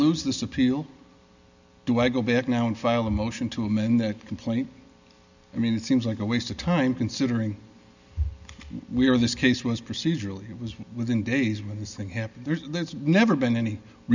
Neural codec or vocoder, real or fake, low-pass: none; real; 7.2 kHz